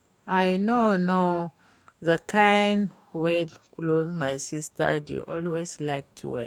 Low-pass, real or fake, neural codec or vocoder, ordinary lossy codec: 19.8 kHz; fake; codec, 44.1 kHz, 2.6 kbps, DAC; none